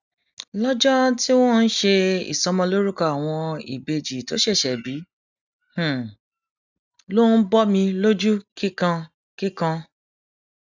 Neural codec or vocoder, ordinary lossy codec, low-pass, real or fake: none; none; 7.2 kHz; real